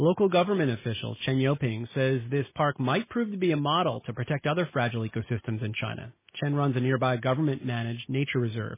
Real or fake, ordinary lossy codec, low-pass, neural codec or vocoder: real; MP3, 16 kbps; 3.6 kHz; none